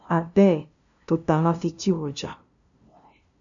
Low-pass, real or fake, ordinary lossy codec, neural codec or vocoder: 7.2 kHz; fake; MP3, 96 kbps; codec, 16 kHz, 0.5 kbps, FunCodec, trained on LibriTTS, 25 frames a second